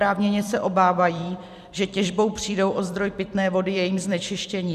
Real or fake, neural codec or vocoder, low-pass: fake; vocoder, 44.1 kHz, 128 mel bands every 256 samples, BigVGAN v2; 14.4 kHz